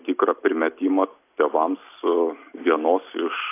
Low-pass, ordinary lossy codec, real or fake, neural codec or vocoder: 3.6 kHz; AAC, 24 kbps; real; none